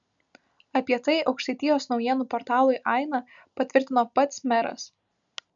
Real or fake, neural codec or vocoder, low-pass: real; none; 7.2 kHz